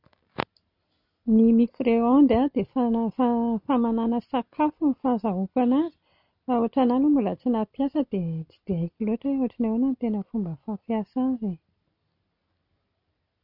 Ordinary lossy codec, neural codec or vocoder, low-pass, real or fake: none; none; 5.4 kHz; real